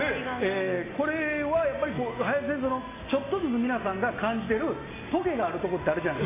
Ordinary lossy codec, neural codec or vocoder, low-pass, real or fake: AAC, 16 kbps; none; 3.6 kHz; real